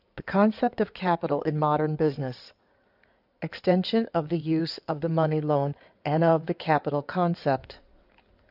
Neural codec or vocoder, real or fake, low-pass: codec, 16 kHz in and 24 kHz out, 2.2 kbps, FireRedTTS-2 codec; fake; 5.4 kHz